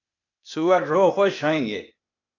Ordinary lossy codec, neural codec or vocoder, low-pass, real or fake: AAC, 48 kbps; codec, 16 kHz, 0.8 kbps, ZipCodec; 7.2 kHz; fake